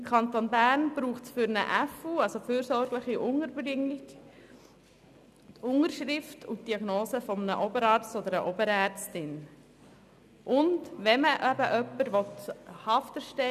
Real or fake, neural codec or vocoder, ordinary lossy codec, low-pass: real; none; none; 14.4 kHz